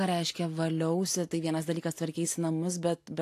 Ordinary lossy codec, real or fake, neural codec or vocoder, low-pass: AAC, 64 kbps; real; none; 14.4 kHz